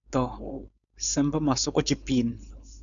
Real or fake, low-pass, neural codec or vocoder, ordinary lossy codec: fake; 7.2 kHz; codec, 16 kHz, 4.8 kbps, FACodec; MP3, 96 kbps